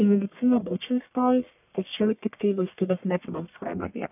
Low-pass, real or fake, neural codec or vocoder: 3.6 kHz; fake; codec, 44.1 kHz, 1.7 kbps, Pupu-Codec